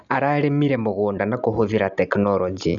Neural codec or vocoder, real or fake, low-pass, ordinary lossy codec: none; real; 7.2 kHz; none